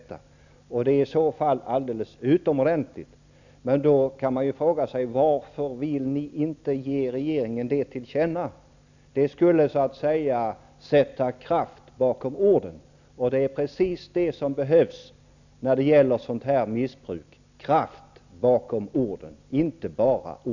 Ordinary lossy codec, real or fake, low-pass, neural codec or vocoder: none; real; 7.2 kHz; none